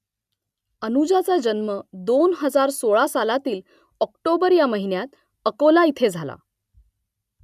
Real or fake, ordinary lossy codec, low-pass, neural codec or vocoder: real; none; 14.4 kHz; none